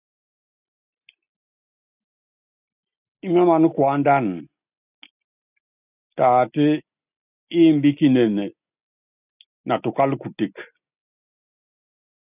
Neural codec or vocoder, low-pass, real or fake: none; 3.6 kHz; real